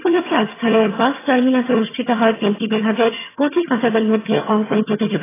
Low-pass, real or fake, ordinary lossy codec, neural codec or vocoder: 3.6 kHz; fake; AAC, 16 kbps; vocoder, 22.05 kHz, 80 mel bands, HiFi-GAN